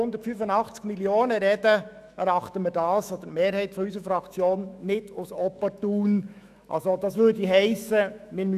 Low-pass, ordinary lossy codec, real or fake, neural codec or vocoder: 14.4 kHz; none; fake; autoencoder, 48 kHz, 128 numbers a frame, DAC-VAE, trained on Japanese speech